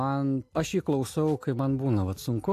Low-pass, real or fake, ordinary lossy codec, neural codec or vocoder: 14.4 kHz; real; AAC, 64 kbps; none